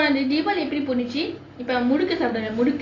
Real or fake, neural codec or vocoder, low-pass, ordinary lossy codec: real; none; 7.2 kHz; AAC, 32 kbps